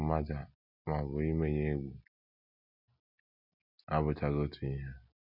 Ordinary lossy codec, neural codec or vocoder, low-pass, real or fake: none; none; 5.4 kHz; real